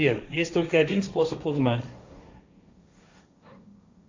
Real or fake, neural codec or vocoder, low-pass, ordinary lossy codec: fake; codec, 16 kHz, 1.1 kbps, Voila-Tokenizer; 7.2 kHz; AAC, 48 kbps